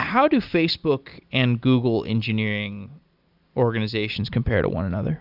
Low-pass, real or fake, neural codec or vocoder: 5.4 kHz; real; none